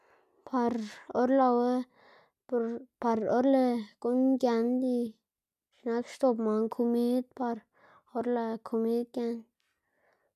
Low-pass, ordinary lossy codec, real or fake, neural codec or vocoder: 14.4 kHz; none; real; none